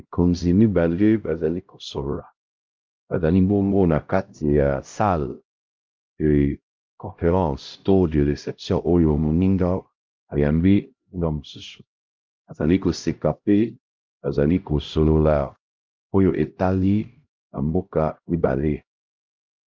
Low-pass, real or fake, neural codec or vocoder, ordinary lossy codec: 7.2 kHz; fake; codec, 16 kHz, 0.5 kbps, X-Codec, HuBERT features, trained on LibriSpeech; Opus, 24 kbps